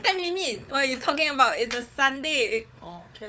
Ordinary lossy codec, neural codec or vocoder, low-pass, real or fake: none; codec, 16 kHz, 4 kbps, FunCodec, trained on Chinese and English, 50 frames a second; none; fake